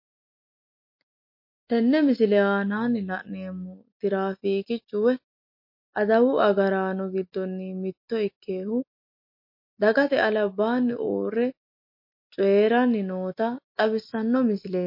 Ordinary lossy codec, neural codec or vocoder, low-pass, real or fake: MP3, 32 kbps; none; 5.4 kHz; real